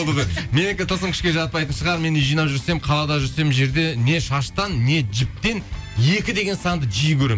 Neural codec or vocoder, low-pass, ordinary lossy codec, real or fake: none; none; none; real